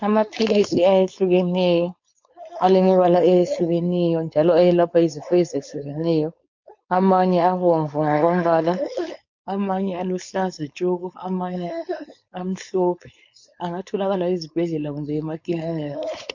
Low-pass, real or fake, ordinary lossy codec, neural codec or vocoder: 7.2 kHz; fake; MP3, 48 kbps; codec, 16 kHz, 4.8 kbps, FACodec